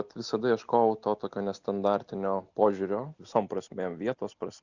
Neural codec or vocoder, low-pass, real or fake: none; 7.2 kHz; real